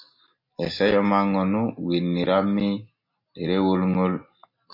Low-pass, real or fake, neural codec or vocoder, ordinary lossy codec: 5.4 kHz; real; none; MP3, 32 kbps